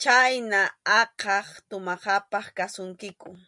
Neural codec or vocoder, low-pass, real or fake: none; 10.8 kHz; real